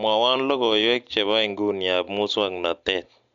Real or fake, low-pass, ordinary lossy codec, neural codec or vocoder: real; 7.2 kHz; MP3, 64 kbps; none